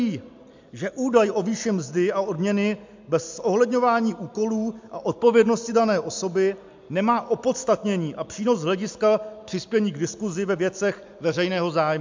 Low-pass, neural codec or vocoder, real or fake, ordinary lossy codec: 7.2 kHz; none; real; MP3, 64 kbps